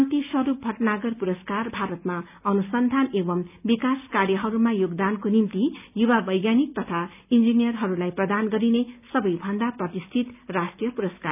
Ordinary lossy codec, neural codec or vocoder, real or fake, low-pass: none; none; real; 3.6 kHz